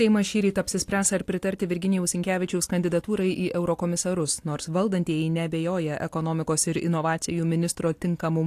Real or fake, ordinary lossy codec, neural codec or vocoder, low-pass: real; AAC, 64 kbps; none; 14.4 kHz